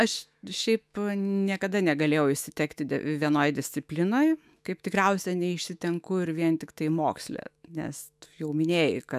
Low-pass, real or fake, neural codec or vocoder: 14.4 kHz; fake; autoencoder, 48 kHz, 128 numbers a frame, DAC-VAE, trained on Japanese speech